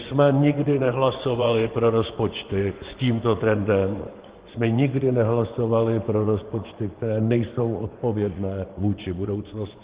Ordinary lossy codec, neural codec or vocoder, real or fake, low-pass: Opus, 16 kbps; vocoder, 24 kHz, 100 mel bands, Vocos; fake; 3.6 kHz